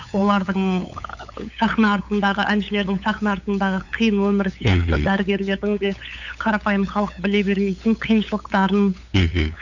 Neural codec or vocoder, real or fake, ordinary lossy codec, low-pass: codec, 16 kHz, 8 kbps, FunCodec, trained on LibriTTS, 25 frames a second; fake; none; 7.2 kHz